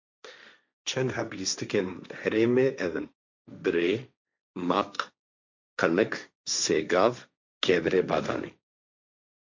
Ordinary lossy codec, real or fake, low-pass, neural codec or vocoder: MP3, 64 kbps; fake; 7.2 kHz; codec, 16 kHz, 1.1 kbps, Voila-Tokenizer